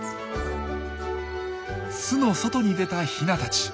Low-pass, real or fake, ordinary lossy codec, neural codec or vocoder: none; real; none; none